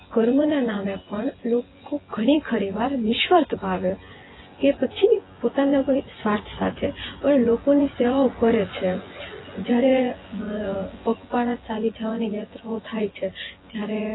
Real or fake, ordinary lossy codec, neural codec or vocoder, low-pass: fake; AAC, 16 kbps; vocoder, 24 kHz, 100 mel bands, Vocos; 7.2 kHz